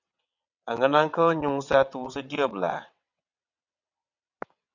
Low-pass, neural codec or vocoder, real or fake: 7.2 kHz; vocoder, 22.05 kHz, 80 mel bands, WaveNeXt; fake